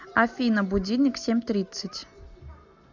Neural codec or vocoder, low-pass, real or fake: none; 7.2 kHz; real